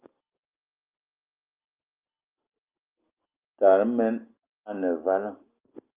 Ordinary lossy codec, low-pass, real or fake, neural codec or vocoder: Opus, 24 kbps; 3.6 kHz; real; none